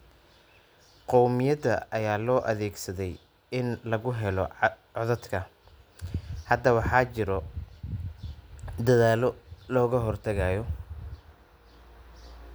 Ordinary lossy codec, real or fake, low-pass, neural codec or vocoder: none; real; none; none